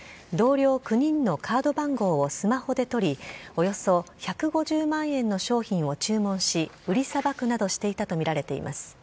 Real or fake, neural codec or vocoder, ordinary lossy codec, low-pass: real; none; none; none